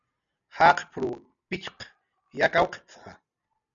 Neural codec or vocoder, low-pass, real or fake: none; 7.2 kHz; real